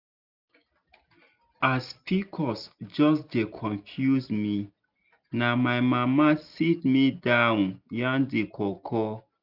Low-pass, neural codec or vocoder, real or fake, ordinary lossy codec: 5.4 kHz; none; real; none